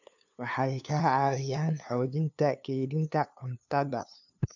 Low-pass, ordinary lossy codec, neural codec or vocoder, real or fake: 7.2 kHz; none; codec, 16 kHz, 2 kbps, FunCodec, trained on LibriTTS, 25 frames a second; fake